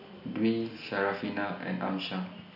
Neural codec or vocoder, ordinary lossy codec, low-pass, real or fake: none; none; 5.4 kHz; real